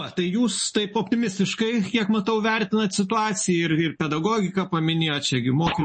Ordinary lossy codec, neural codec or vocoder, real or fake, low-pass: MP3, 32 kbps; none; real; 9.9 kHz